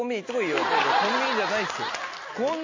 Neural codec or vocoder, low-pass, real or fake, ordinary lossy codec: none; 7.2 kHz; real; MP3, 32 kbps